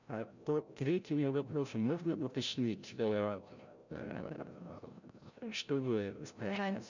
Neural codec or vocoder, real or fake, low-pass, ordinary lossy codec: codec, 16 kHz, 0.5 kbps, FreqCodec, larger model; fake; 7.2 kHz; none